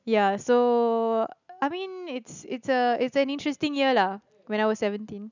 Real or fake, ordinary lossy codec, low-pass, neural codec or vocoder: real; none; 7.2 kHz; none